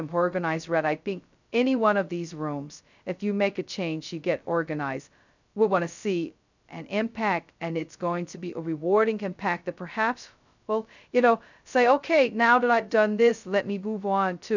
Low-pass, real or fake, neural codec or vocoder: 7.2 kHz; fake; codec, 16 kHz, 0.2 kbps, FocalCodec